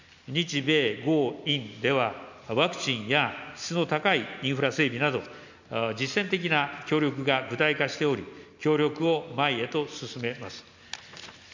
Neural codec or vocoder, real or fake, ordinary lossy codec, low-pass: none; real; MP3, 64 kbps; 7.2 kHz